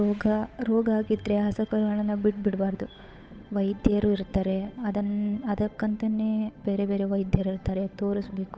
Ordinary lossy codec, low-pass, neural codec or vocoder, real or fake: none; none; codec, 16 kHz, 8 kbps, FunCodec, trained on Chinese and English, 25 frames a second; fake